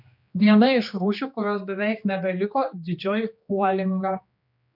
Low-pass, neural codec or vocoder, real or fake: 5.4 kHz; codec, 16 kHz, 2 kbps, X-Codec, HuBERT features, trained on general audio; fake